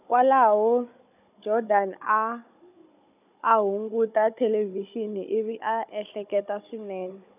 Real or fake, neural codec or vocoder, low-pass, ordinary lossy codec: fake; codec, 16 kHz, 16 kbps, FunCodec, trained on Chinese and English, 50 frames a second; 3.6 kHz; none